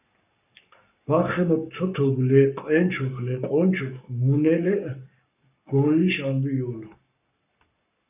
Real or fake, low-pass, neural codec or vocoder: fake; 3.6 kHz; codec, 44.1 kHz, 7.8 kbps, Pupu-Codec